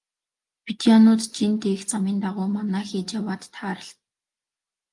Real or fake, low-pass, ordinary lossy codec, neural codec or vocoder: real; 10.8 kHz; Opus, 32 kbps; none